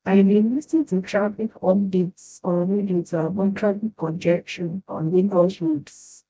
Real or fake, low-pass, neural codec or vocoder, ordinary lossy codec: fake; none; codec, 16 kHz, 0.5 kbps, FreqCodec, smaller model; none